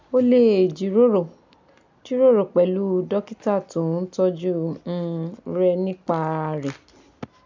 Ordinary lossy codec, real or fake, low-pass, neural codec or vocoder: MP3, 64 kbps; real; 7.2 kHz; none